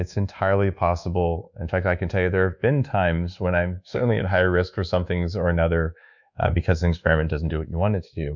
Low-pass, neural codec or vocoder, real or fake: 7.2 kHz; codec, 24 kHz, 1.2 kbps, DualCodec; fake